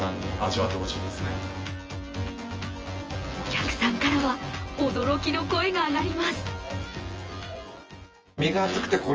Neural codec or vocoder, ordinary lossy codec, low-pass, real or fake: vocoder, 24 kHz, 100 mel bands, Vocos; Opus, 24 kbps; 7.2 kHz; fake